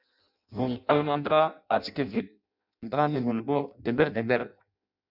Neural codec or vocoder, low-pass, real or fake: codec, 16 kHz in and 24 kHz out, 0.6 kbps, FireRedTTS-2 codec; 5.4 kHz; fake